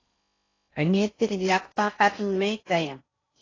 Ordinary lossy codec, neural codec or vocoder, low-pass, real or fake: AAC, 32 kbps; codec, 16 kHz in and 24 kHz out, 0.6 kbps, FocalCodec, streaming, 4096 codes; 7.2 kHz; fake